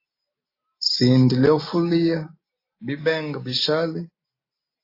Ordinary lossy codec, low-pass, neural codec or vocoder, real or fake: AAC, 32 kbps; 5.4 kHz; none; real